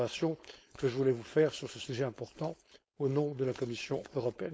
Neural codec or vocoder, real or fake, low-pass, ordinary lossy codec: codec, 16 kHz, 4.8 kbps, FACodec; fake; none; none